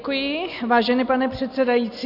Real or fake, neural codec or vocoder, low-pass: real; none; 5.4 kHz